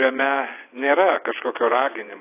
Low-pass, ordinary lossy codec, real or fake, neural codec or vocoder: 3.6 kHz; AAC, 24 kbps; fake; vocoder, 22.05 kHz, 80 mel bands, WaveNeXt